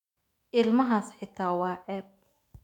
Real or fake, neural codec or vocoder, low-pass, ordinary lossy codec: fake; autoencoder, 48 kHz, 128 numbers a frame, DAC-VAE, trained on Japanese speech; 19.8 kHz; MP3, 96 kbps